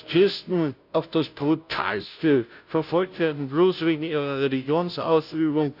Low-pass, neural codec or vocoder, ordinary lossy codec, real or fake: 5.4 kHz; codec, 16 kHz, 0.5 kbps, FunCodec, trained on Chinese and English, 25 frames a second; none; fake